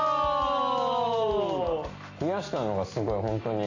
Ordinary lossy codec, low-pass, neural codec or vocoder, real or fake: AAC, 48 kbps; 7.2 kHz; none; real